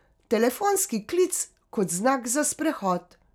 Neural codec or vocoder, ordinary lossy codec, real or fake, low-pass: none; none; real; none